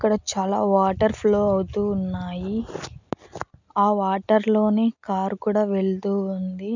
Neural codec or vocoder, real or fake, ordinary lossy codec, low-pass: none; real; none; 7.2 kHz